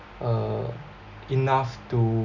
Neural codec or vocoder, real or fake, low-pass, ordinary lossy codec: none; real; 7.2 kHz; none